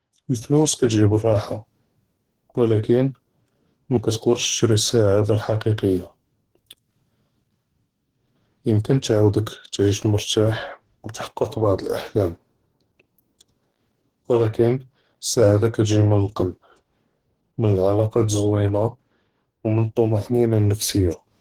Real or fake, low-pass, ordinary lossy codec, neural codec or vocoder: fake; 19.8 kHz; Opus, 16 kbps; codec, 44.1 kHz, 2.6 kbps, DAC